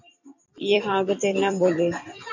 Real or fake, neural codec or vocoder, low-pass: fake; vocoder, 44.1 kHz, 128 mel bands every 256 samples, BigVGAN v2; 7.2 kHz